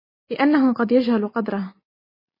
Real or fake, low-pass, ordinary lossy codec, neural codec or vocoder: real; 5.4 kHz; MP3, 24 kbps; none